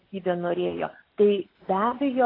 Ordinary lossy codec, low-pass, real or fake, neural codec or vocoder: AAC, 24 kbps; 5.4 kHz; real; none